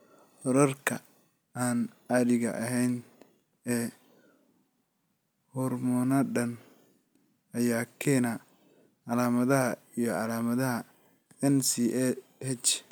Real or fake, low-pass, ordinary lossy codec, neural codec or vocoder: real; none; none; none